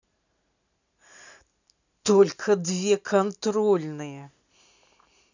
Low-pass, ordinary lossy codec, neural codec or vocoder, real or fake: 7.2 kHz; none; none; real